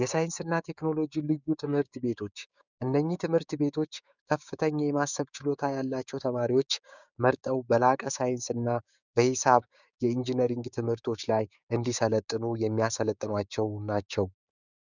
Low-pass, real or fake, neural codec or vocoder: 7.2 kHz; fake; codec, 16 kHz, 6 kbps, DAC